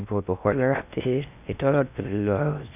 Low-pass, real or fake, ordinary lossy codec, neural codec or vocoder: 3.6 kHz; fake; none; codec, 16 kHz in and 24 kHz out, 0.6 kbps, FocalCodec, streaming, 2048 codes